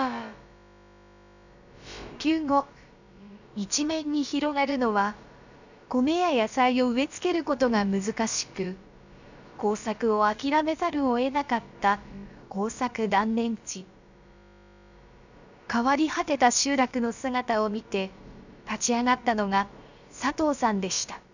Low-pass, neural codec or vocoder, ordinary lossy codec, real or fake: 7.2 kHz; codec, 16 kHz, about 1 kbps, DyCAST, with the encoder's durations; none; fake